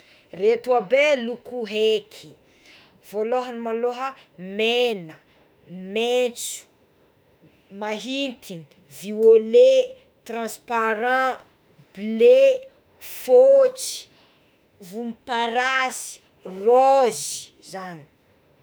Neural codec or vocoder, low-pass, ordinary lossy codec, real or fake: autoencoder, 48 kHz, 32 numbers a frame, DAC-VAE, trained on Japanese speech; none; none; fake